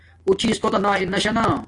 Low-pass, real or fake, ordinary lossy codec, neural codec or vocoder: 10.8 kHz; real; AAC, 48 kbps; none